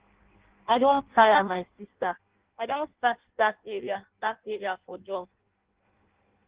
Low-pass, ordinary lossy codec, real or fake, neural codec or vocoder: 3.6 kHz; Opus, 16 kbps; fake; codec, 16 kHz in and 24 kHz out, 0.6 kbps, FireRedTTS-2 codec